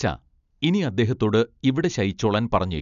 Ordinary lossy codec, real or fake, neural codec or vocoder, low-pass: none; real; none; 7.2 kHz